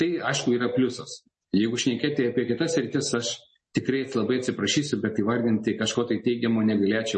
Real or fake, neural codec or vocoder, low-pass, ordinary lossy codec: real; none; 10.8 kHz; MP3, 32 kbps